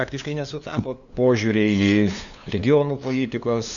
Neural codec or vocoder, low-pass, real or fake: codec, 16 kHz, 2 kbps, FunCodec, trained on LibriTTS, 25 frames a second; 7.2 kHz; fake